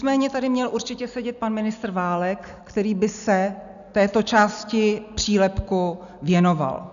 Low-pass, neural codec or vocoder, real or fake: 7.2 kHz; none; real